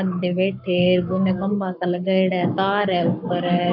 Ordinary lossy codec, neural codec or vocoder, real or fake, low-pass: none; codec, 44.1 kHz, 7.8 kbps, Pupu-Codec; fake; 5.4 kHz